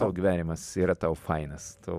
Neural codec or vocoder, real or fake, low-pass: vocoder, 44.1 kHz, 128 mel bands every 256 samples, BigVGAN v2; fake; 14.4 kHz